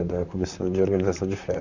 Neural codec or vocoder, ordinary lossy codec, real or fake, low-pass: vocoder, 44.1 kHz, 128 mel bands, Pupu-Vocoder; Opus, 64 kbps; fake; 7.2 kHz